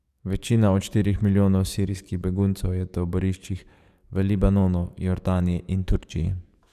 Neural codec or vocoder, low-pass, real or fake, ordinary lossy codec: codec, 44.1 kHz, 7.8 kbps, DAC; 14.4 kHz; fake; none